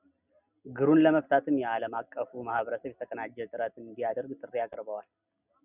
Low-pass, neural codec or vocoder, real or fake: 3.6 kHz; none; real